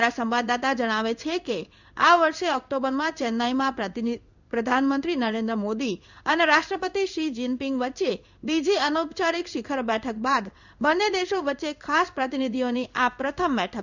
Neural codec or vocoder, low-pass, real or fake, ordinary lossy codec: codec, 16 kHz in and 24 kHz out, 1 kbps, XY-Tokenizer; 7.2 kHz; fake; none